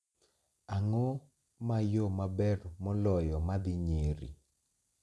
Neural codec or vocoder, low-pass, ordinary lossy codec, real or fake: none; none; none; real